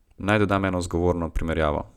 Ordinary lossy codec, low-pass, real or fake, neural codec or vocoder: none; 19.8 kHz; real; none